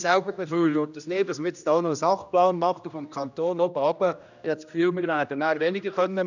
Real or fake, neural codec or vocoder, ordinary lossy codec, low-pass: fake; codec, 16 kHz, 1 kbps, X-Codec, HuBERT features, trained on general audio; none; 7.2 kHz